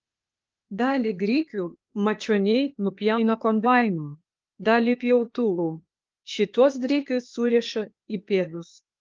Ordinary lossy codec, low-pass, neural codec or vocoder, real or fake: Opus, 24 kbps; 7.2 kHz; codec, 16 kHz, 0.8 kbps, ZipCodec; fake